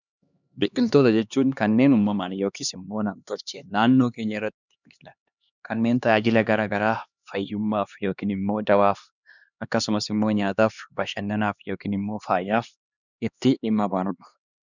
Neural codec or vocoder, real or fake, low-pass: codec, 16 kHz, 2 kbps, X-Codec, HuBERT features, trained on LibriSpeech; fake; 7.2 kHz